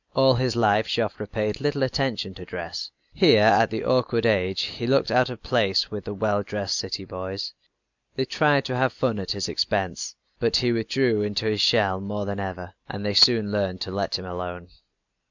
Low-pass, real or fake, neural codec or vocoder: 7.2 kHz; real; none